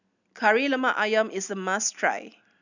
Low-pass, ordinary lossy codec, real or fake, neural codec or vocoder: 7.2 kHz; none; real; none